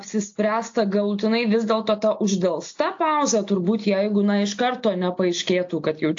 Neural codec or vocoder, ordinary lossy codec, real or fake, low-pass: none; AAC, 48 kbps; real; 7.2 kHz